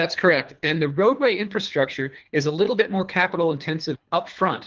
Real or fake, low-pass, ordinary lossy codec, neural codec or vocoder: fake; 7.2 kHz; Opus, 32 kbps; codec, 24 kHz, 3 kbps, HILCodec